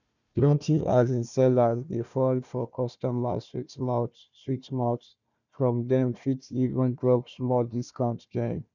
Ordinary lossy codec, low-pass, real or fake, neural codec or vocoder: none; 7.2 kHz; fake; codec, 16 kHz, 1 kbps, FunCodec, trained on Chinese and English, 50 frames a second